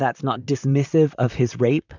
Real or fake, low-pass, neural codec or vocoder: fake; 7.2 kHz; vocoder, 44.1 kHz, 128 mel bands, Pupu-Vocoder